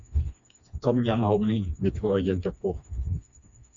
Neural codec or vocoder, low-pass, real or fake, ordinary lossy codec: codec, 16 kHz, 2 kbps, FreqCodec, smaller model; 7.2 kHz; fake; MP3, 64 kbps